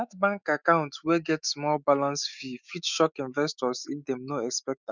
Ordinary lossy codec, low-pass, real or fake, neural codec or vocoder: none; 7.2 kHz; fake; autoencoder, 48 kHz, 128 numbers a frame, DAC-VAE, trained on Japanese speech